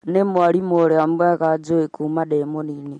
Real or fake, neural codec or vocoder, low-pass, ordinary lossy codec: real; none; 19.8 kHz; MP3, 48 kbps